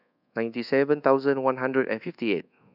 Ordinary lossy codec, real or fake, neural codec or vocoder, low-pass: none; fake; codec, 24 kHz, 1.2 kbps, DualCodec; 5.4 kHz